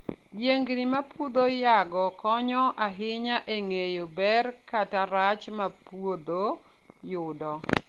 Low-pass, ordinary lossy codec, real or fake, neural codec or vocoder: 19.8 kHz; Opus, 16 kbps; real; none